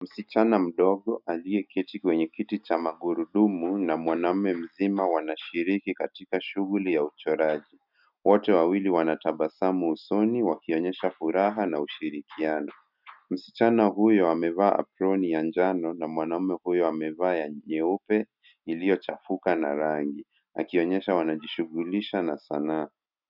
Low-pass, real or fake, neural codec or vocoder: 5.4 kHz; real; none